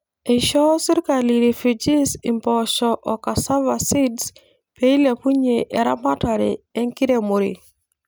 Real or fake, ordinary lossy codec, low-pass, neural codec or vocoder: real; none; none; none